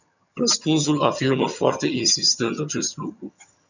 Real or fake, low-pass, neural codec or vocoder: fake; 7.2 kHz; vocoder, 22.05 kHz, 80 mel bands, HiFi-GAN